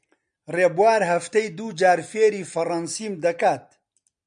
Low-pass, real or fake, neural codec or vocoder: 9.9 kHz; real; none